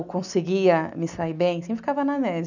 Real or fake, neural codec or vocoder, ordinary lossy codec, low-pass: real; none; none; 7.2 kHz